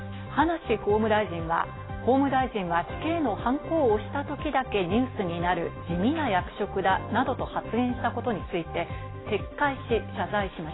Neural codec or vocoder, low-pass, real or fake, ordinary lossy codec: none; 7.2 kHz; real; AAC, 16 kbps